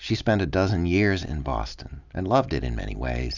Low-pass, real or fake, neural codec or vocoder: 7.2 kHz; real; none